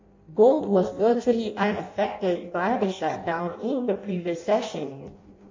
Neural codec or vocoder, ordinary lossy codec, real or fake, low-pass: codec, 16 kHz in and 24 kHz out, 0.6 kbps, FireRedTTS-2 codec; MP3, 48 kbps; fake; 7.2 kHz